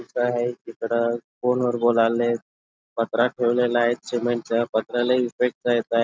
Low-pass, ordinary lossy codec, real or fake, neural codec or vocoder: none; none; real; none